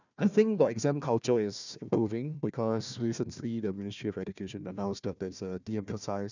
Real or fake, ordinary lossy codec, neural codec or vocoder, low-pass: fake; none; codec, 16 kHz, 1 kbps, FunCodec, trained on Chinese and English, 50 frames a second; 7.2 kHz